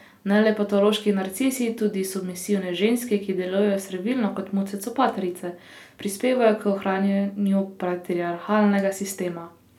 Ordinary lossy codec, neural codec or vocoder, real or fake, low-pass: none; none; real; 19.8 kHz